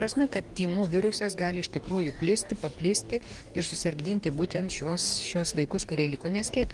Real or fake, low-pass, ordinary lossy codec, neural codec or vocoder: fake; 10.8 kHz; Opus, 24 kbps; codec, 44.1 kHz, 2.6 kbps, DAC